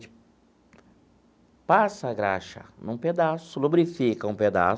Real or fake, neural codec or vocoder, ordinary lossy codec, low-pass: real; none; none; none